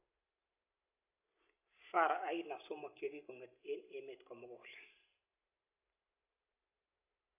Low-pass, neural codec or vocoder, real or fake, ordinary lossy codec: 3.6 kHz; none; real; AAC, 32 kbps